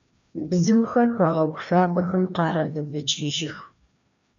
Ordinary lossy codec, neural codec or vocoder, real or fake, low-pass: MP3, 96 kbps; codec, 16 kHz, 1 kbps, FreqCodec, larger model; fake; 7.2 kHz